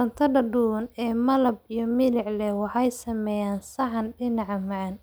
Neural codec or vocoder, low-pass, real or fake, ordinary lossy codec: none; none; real; none